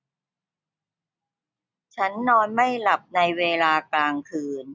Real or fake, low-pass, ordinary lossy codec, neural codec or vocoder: real; none; none; none